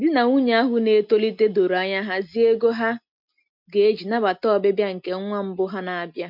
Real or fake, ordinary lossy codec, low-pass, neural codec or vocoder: real; MP3, 48 kbps; 5.4 kHz; none